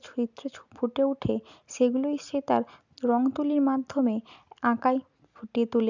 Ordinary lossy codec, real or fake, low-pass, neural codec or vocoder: none; real; 7.2 kHz; none